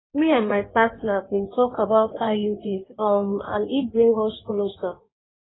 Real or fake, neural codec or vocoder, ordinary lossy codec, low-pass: fake; codec, 16 kHz in and 24 kHz out, 1.1 kbps, FireRedTTS-2 codec; AAC, 16 kbps; 7.2 kHz